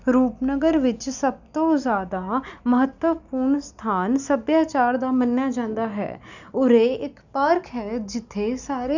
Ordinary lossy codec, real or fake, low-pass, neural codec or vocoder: none; real; 7.2 kHz; none